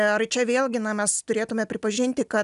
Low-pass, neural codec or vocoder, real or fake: 10.8 kHz; none; real